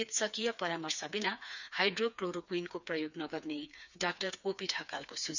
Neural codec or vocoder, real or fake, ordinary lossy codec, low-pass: codec, 16 kHz, 4 kbps, FreqCodec, smaller model; fake; none; 7.2 kHz